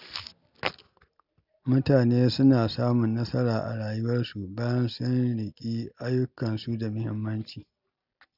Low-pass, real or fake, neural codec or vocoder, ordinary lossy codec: 5.4 kHz; real; none; none